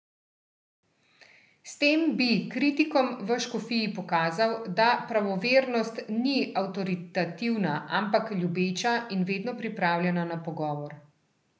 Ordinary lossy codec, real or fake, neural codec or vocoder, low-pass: none; real; none; none